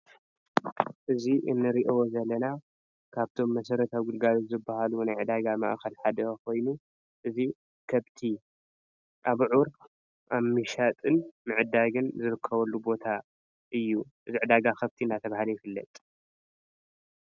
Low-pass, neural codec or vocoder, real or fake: 7.2 kHz; none; real